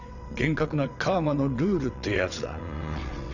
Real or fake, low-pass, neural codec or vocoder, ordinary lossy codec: fake; 7.2 kHz; vocoder, 22.05 kHz, 80 mel bands, WaveNeXt; none